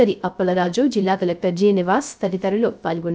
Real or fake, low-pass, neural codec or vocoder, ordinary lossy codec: fake; none; codec, 16 kHz, 0.3 kbps, FocalCodec; none